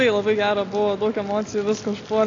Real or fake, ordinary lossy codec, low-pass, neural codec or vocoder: real; AAC, 32 kbps; 7.2 kHz; none